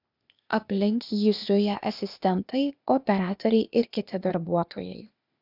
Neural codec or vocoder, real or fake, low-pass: codec, 16 kHz, 0.8 kbps, ZipCodec; fake; 5.4 kHz